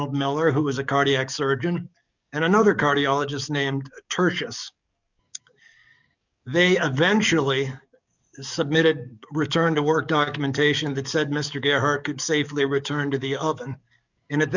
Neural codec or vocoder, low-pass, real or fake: codec, 44.1 kHz, 7.8 kbps, DAC; 7.2 kHz; fake